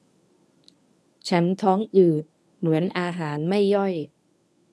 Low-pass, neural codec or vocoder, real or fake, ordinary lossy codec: none; codec, 24 kHz, 0.9 kbps, WavTokenizer, medium speech release version 1; fake; none